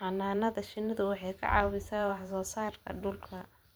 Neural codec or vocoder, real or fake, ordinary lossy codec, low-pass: vocoder, 44.1 kHz, 128 mel bands every 512 samples, BigVGAN v2; fake; none; none